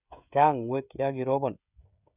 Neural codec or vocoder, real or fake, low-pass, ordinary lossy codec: codec, 16 kHz, 16 kbps, FreqCodec, smaller model; fake; 3.6 kHz; none